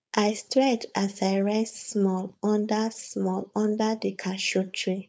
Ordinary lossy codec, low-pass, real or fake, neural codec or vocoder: none; none; fake; codec, 16 kHz, 4.8 kbps, FACodec